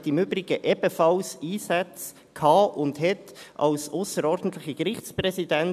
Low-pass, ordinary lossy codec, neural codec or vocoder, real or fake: 14.4 kHz; none; none; real